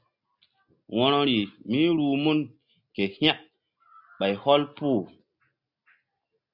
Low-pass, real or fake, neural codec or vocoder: 5.4 kHz; real; none